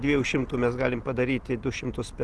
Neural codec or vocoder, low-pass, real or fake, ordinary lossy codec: none; 10.8 kHz; real; Opus, 16 kbps